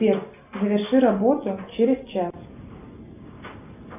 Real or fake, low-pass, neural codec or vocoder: real; 3.6 kHz; none